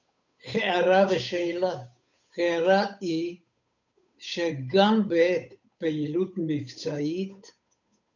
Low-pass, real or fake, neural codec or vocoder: 7.2 kHz; fake; codec, 16 kHz, 8 kbps, FunCodec, trained on Chinese and English, 25 frames a second